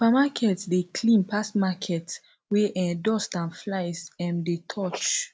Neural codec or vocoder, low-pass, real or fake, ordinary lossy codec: none; none; real; none